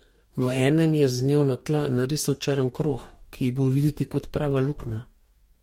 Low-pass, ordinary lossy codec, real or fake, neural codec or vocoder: 19.8 kHz; MP3, 64 kbps; fake; codec, 44.1 kHz, 2.6 kbps, DAC